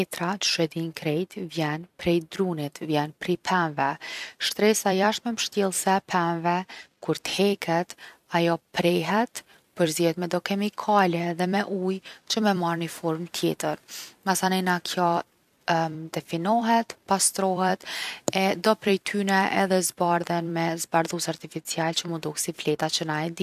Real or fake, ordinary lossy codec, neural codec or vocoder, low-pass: fake; none; vocoder, 44.1 kHz, 128 mel bands every 256 samples, BigVGAN v2; 14.4 kHz